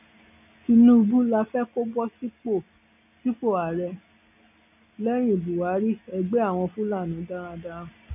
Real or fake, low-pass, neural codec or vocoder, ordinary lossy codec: real; 3.6 kHz; none; none